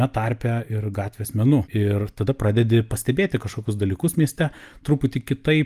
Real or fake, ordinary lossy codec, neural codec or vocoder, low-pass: real; Opus, 32 kbps; none; 14.4 kHz